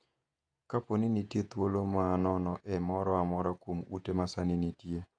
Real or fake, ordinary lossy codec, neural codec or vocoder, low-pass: fake; AAC, 64 kbps; vocoder, 48 kHz, 128 mel bands, Vocos; 9.9 kHz